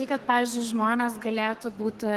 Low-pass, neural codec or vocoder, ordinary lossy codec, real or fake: 14.4 kHz; codec, 32 kHz, 1.9 kbps, SNAC; Opus, 16 kbps; fake